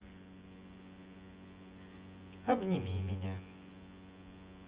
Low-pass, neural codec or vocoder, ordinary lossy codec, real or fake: 3.6 kHz; vocoder, 24 kHz, 100 mel bands, Vocos; Opus, 24 kbps; fake